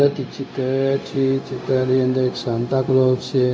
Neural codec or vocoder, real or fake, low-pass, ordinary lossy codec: codec, 16 kHz, 0.4 kbps, LongCat-Audio-Codec; fake; none; none